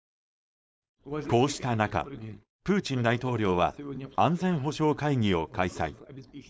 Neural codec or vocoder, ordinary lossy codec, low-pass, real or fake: codec, 16 kHz, 4.8 kbps, FACodec; none; none; fake